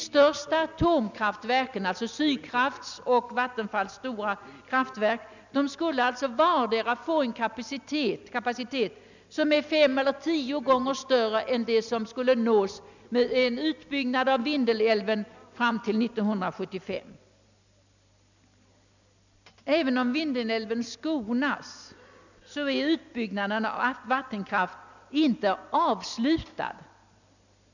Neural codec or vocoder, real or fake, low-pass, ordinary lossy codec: none; real; 7.2 kHz; none